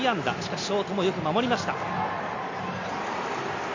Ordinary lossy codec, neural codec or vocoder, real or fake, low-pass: MP3, 64 kbps; none; real; 7.2 kHz